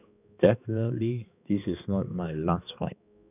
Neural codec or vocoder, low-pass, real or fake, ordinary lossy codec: codec, 16 kHz, 2 kbps, X-Codec, HuBERT features, trained on balanced general audio; 3.6 kHz; fake; none